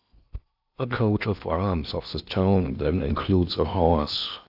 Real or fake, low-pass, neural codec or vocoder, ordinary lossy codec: fake; 5.4 kHz; codec, 16 kHz in and 24 kHz out, 0.8 kbps, FocalCodec, streaming, 65536 codes; AAC, 48 kbps